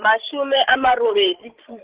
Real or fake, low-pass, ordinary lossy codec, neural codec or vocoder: fake; 3.6 kHz; Opus, 16 kbps; codec, 16 kHz, 16 kbps, FreqCodec, larger model